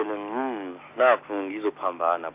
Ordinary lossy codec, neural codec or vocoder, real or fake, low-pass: AAC, 24 kbps; none; real; 3.6 kHz